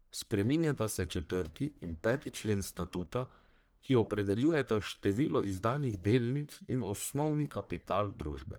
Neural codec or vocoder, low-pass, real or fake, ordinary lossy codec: codec, 44.1 kHz, 1.7 kbps, Pupu-Codec; none; fake; none